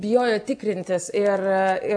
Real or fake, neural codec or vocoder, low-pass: real; none; 9.9 kHz